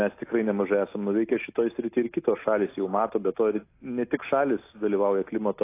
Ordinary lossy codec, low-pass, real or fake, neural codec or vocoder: AAC, 24 kbps; 3.6 kHz; real; none